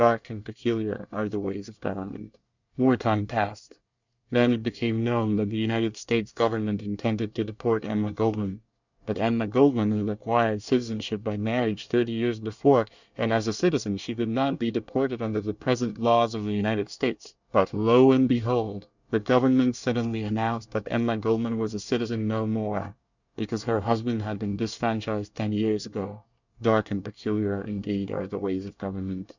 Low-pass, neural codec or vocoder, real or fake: 7.2 kHz; codec, 24 kHz, 1 kbps, SNAC; fake